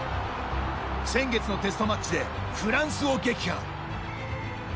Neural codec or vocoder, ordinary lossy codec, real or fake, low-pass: none; none; real; none